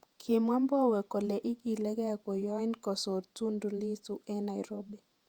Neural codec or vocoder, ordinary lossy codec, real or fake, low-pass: vocoder, 48 kHz, 128 mel bands, Vocos; Opus, 64 kbps; fake; 19.8 kHz